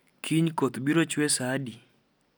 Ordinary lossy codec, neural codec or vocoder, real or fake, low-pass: none; none; real; none